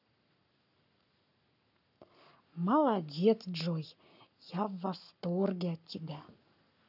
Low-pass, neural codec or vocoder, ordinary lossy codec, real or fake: 5.4 kHz; codec, 44.1 kHz, 7.8 kbps, Pupu-Codec; none; fake